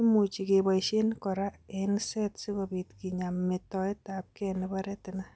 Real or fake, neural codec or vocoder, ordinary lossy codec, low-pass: real; none; none; none